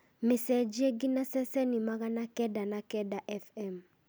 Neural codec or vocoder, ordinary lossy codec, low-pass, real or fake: none; none; none; real